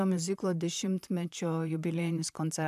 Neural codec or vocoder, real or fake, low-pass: vocoder, 44.1 kHz, 128 mel bands, Pupu-Vocoder; fake; 14.4 kHz